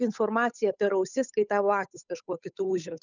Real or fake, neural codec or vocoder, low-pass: fake; codec, 16 kHz, 8 kbps, FunCodec, trained on Chinese and English, 25 frames a second; 7.2 kHz